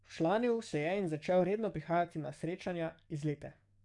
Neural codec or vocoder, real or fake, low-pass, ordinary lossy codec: codec, 44.1 kHz, 7.8 kbps, DAC; fake; 10.8 kHz; none